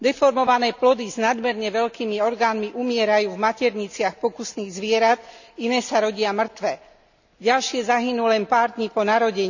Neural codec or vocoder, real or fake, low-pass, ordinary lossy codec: none; real; 7.2 kHz; none